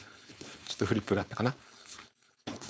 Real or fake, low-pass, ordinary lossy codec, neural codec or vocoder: fake; none; none; codec, 16 kHz, 4.8 kbps, FACodec